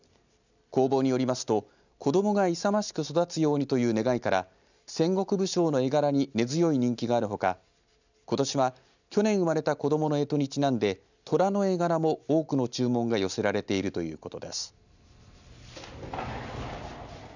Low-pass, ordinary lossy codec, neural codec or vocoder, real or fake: 7.2 kHz; none; none; real